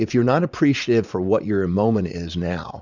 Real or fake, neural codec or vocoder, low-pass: fake; vocoder, 44.1 kHz, 80 mel bands, Vocos; 7.2 kHz